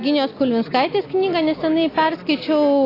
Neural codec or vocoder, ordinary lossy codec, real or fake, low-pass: none; AAC, 24 kbps; real; 5.4 kHz